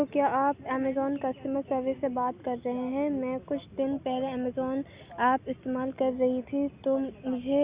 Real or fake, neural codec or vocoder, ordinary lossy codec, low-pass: real; none; Opus, 64 kbps; 3.6 kHz